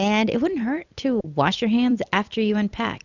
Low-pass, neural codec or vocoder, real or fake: 7.2 kHz; vocoder, 44.1 kHz, 128 mel bands every 256 samples, BigVGAN v2; fake